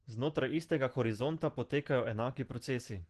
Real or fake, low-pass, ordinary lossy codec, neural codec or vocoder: real; 10.8 kHz; Opus, 16 kbps; none